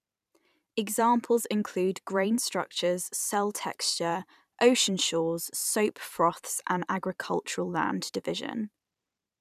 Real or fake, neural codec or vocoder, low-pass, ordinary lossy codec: fake; vocoder, 44.1 kHz, 128 mel bands every 512 samples, BigVGAN v2; 14.4 kHz; none